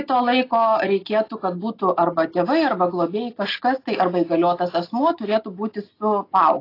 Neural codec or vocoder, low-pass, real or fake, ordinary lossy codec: none; 5.4 kHz; real; AAC, 32 kbps